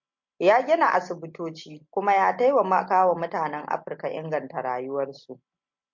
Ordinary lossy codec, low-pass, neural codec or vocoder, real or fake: MP3, 48 kbps; 7.2 kHz; none; real